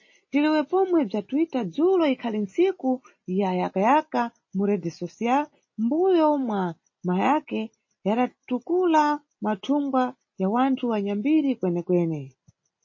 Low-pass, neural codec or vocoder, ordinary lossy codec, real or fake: 7.2 kHz; none; MP3, 32 kbps; real